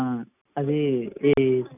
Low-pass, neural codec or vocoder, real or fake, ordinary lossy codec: 3.6 kHz; none; real; none